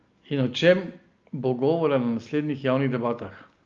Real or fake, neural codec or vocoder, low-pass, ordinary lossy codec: real; none; 7.2 kHz; Opus, 32 kbps